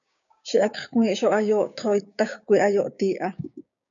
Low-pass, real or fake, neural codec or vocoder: 7.2 kHz; fake; codec, 16 kHz, 6 kbps, DAC